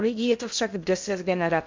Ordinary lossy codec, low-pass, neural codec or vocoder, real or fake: MP3, 64 kbps; 7.2 kHz; codec, 16 kHz in and 24 kHz out, 0.6 kbps, FocalCodec, streaming, 2048 codes; fake